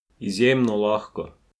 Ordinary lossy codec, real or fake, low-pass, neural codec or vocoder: none; real; none; none